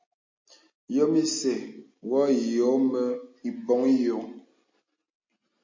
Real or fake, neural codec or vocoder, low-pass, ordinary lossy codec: real; none; 7.2 kHz; MP3, 32 kbps